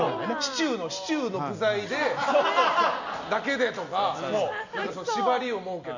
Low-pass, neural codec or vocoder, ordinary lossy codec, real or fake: 7.2 kHz; none; none; real